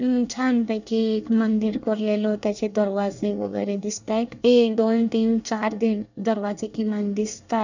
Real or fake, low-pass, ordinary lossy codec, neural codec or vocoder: fake; 7.2 kHz; none; codec, 24 kHz, 1 kbps, SNAC